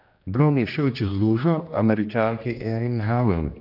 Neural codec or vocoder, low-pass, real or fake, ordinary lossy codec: codec, 16 kHz, 1 kbps, X-Codec, HuBERT features, trained on general audio; 5.4 kHz; fake; none